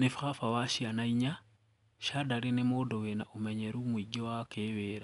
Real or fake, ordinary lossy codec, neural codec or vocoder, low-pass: real; none; none; 10.8 kHz